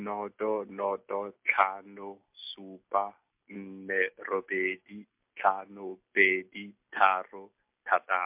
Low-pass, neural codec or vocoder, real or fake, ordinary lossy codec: 3.6 kHz; none; real; MP3, 32 kbps